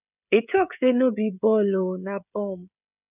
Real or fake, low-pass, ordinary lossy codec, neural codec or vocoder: fake; 3.6 kHz; none; codec, 16 kHz, 16 kbps, FreqCodec, smaller model